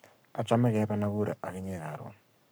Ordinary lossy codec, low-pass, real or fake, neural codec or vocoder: none; none; fake; codec, 44.1 kHz, 7.8 kbps, Pupu-Codec